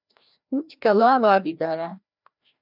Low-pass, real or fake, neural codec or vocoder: 5.4 kHz; fake; codec, 16 kHz, 1 kbps, FreqCodec, larger model